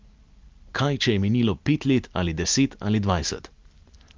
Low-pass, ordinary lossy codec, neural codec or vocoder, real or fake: 7.2 kHz; Opus, 24 kbps; none; real